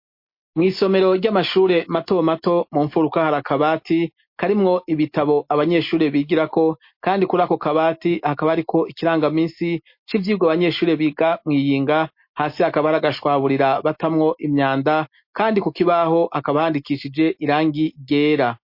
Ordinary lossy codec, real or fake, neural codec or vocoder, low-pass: MP3, 32 kbps; real; none; 5.4 kHz